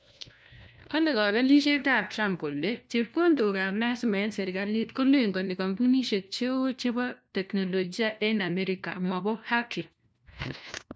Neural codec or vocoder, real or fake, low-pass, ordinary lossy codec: codec, 16 kHz, 1 kbps, FunCodec, trained on LibriTTS, 50 frames a second; fake; none; none